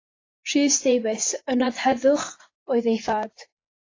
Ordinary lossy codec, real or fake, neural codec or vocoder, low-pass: AAC, 32 kbps; fake; vocoder, 24 kHz, 100 mel bands, Vocos; 7.2 kHz